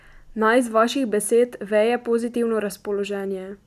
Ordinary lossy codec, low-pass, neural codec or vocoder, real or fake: none; 14.4 kHz; none; real